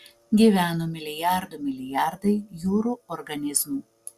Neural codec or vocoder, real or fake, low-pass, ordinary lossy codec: none; real; 14.4 kHz; Opus, 64 kbps